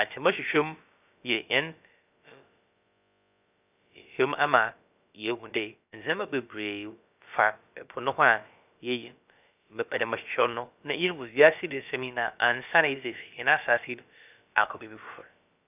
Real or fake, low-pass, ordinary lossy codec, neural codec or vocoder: fake; 3.6 kHz; none; codec, 16 kHz, about 1 kbps, DyCAST, with the encoder's durations